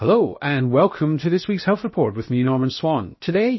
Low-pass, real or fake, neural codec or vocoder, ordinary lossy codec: 7.2 kHz; fake; codec, 16 kHz, 0.7 kbps, FocalCodec; MP3, 24 kbps